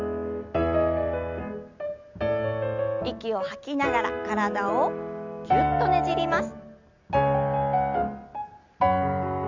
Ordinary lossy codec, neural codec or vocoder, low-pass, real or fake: none; none; 7.2 kHz; real